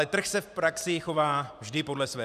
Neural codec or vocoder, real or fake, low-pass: vocoder, 44.1 kHz, 128 mel bands every 512 samples, BigVGAN v2; fake; 14.4 kHz